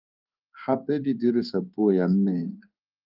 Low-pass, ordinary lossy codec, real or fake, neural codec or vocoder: 5.4 kHz; Opus, 32 kbps; fake; codec, 16 kHz in and 24 kHz out, 1 kbps, XY-Tokenizer